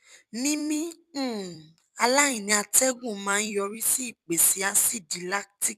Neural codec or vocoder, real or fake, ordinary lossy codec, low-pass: vocoder, 44.1 kHz, 128 mel bands every 256 samples, BigVGAN v2; fake; none; 14.4 kHz